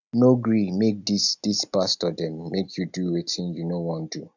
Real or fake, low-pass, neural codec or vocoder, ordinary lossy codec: real; 7.2 kHz; none; none